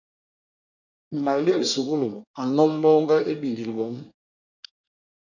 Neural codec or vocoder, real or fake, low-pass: codec, 24 kHz, 1 kbps, SNAC; fake; 7.2 kHz